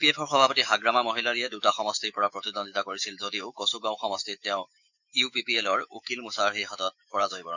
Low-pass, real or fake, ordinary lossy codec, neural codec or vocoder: 7.2 kHz; fake; none; autoencoder, 48 kHz, 128 numbers a frame, DAC-VAE, trained on Japanese speech